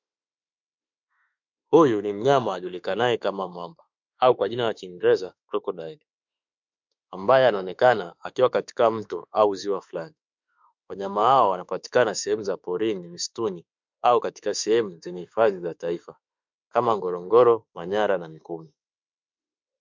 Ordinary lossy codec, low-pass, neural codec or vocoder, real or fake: MP3, 64 kbps; 7.2 kHz; autoencoder, 48 kHz, 32 numbers a frame, DAC-VAE, trained on Japanese speech; fake